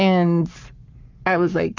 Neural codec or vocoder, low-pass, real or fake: codec, 44.1 kHz, 7.8 kbps, Pupu-Codec; 7.2 kHz; fake